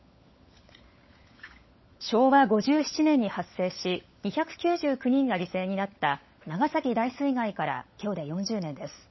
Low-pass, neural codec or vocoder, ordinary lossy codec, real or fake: 7.2 kHz; codec, 16 kHz, 16 kbps, FunCodec, trained on LibriTTS, 50 frames a second; MP3, 24 kbps; fake